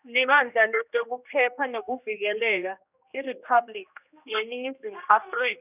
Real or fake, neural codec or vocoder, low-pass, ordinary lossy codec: fake; codec, 16 kHz, 1 kbps, X-Codec, HuBERT features, trained on general audio; 3.6 kHz; none